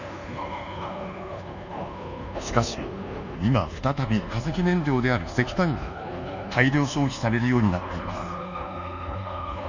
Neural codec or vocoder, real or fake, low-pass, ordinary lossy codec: codec, 24 kHz, 1.2 kbps, DualCodec; fake; 7.2 kHz; none